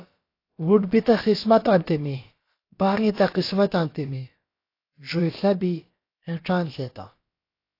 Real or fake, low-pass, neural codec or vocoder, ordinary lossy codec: fake; 5.4 kHz; codec, 16 kHz, about 1 kbps, DyCAST, with the encoder's durations; AAC, 32 kbps